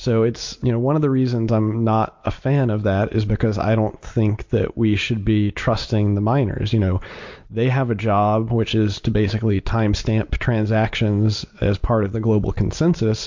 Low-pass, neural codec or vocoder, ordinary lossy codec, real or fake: 7.2 kHz; none; MP3, 48 kbps; real